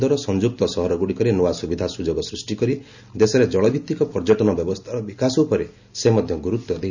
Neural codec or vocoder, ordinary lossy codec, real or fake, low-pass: none; none; real; 7.2 kHz